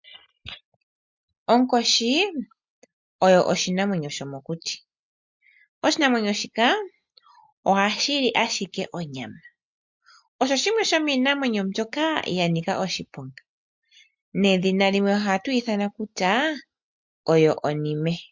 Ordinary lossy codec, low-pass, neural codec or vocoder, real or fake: MP3, 64 kbps; 7.2 kHz; none; real